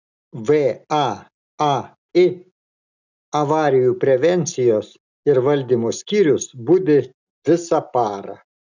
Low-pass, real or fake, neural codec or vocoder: 7.2 kHz; real; none